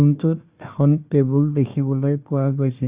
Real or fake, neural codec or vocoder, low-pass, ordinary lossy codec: fake; codec, 16 kHz, 1 kbps, FunCodec, trained on Chinese and English, 50 frames a second; 3.6 kHz; Opus, 64 kbps